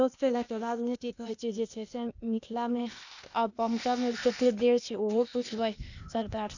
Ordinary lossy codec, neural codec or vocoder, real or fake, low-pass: none; codec, 16 kHz, 0.8 kbps, ZipCodec; fake; 7.2 kHz